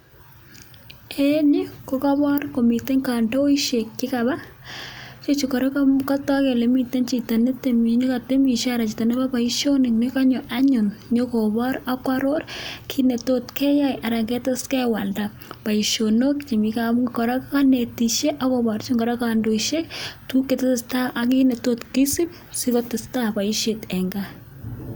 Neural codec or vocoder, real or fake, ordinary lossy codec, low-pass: none; real; none; none